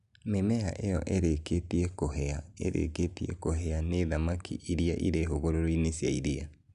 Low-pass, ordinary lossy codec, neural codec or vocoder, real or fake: 10.8 kHz; none; none; real